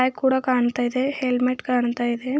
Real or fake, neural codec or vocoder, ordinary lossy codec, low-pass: real; none; none; none